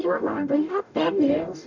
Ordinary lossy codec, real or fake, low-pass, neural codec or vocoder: none; fake; 7.2 kHz; codec, 44.1 kHz, 0.9 kbps, DAC